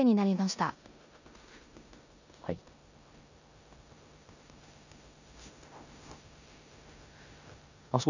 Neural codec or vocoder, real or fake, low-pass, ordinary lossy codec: codec, 16 kHz in and 24 kHz out, 0.9 kbps, LongCat-Audio-Codec, four codebook decoder; fake; 7.2 kHz; none